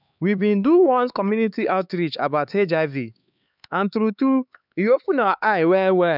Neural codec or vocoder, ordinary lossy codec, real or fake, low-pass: codec, 16 kHz, 4 kbps, X-Codec, HuBERT features, trained on LibriSpeech; none; fake; 5.4 kHz